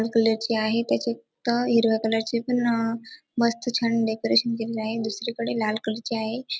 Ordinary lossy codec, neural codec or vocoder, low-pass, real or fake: none; none; none; real